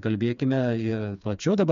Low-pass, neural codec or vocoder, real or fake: 7.2 kHz; codec, 16 kHz, 4 kbps, FreqCodec, smaller model; fake